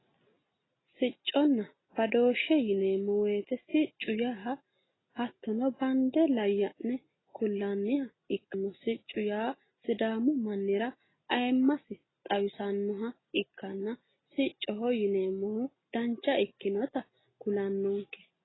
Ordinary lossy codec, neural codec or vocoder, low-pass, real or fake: AAC, 16 kbps; none; 7.2 kHz; real